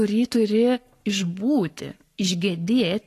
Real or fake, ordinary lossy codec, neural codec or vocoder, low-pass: fake; AAC, 48 kbps; codec, 44.1 kHz, 7.8 kbps, Pupu-Codec; 14.4 kHz